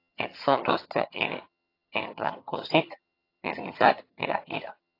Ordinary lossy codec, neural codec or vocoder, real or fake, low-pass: AAC, 32 kbps; vocoder, 22.05 kHz, 80 mel bands, HiFi-GAN; fake; 5.4 kHz